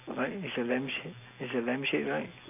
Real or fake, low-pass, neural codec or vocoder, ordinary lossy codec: real; 3.6 kHz; none; none